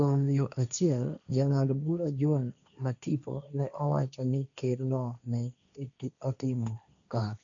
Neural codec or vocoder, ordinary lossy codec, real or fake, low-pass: codec, 16 kHz, 1.1 kbps, Voila-Tokenizer; none; fake; 7.2 kHz